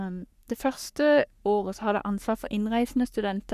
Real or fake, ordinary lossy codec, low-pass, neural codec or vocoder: fake; none; 14.4 kHz; codec, 44.1 kHz, 3.4 kbps, Pupu-Codec